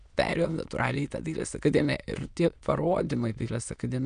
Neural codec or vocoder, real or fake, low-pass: autoencoder, 22.05 kHz, a latent of 192 numbers a frame, VITS, trained on many speakers; fake; 9.9 kHz